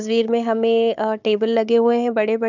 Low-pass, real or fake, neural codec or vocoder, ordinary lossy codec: 7.2 kHz; fake; codec, 16 kHz, 4 kbps, FunCodec, trained on Chinese and English, 50 frames a second; none